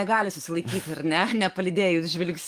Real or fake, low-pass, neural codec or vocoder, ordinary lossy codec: fake; 14.4 kHz; codec, 44.1 kHz, 7.8 kbps, DAC; Opus, 32 kbps